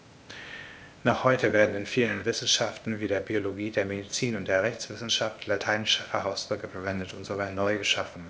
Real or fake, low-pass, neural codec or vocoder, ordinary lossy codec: fake; none; codec, 16 kHz, 0.8 kbps, ZipCodec; none